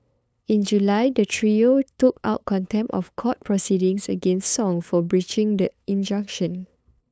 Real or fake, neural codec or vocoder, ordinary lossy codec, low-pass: fake; codec, 16 kHz, 8 kbps, FunCodec, trained on LibriTTS, 25 frames a second; none; none